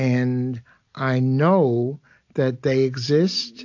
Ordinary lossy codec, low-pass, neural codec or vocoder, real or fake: AAC, 48 kbps; 7.2 kHz; none; real